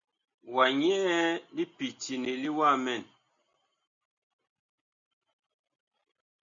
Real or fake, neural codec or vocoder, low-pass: real; none; 7.2 kHz